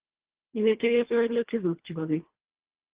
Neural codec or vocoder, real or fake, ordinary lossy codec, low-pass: codec, 24 kHz, 1.5 kbps, HILCodec; fake; Opus, 16 kbps; 3.6 kHz